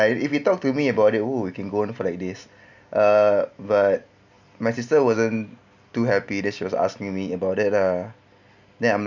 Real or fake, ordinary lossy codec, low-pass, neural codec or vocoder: real; none; 7.2 kHz; none